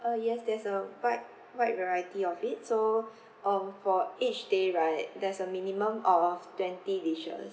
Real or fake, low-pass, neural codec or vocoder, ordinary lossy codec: real; none; none; none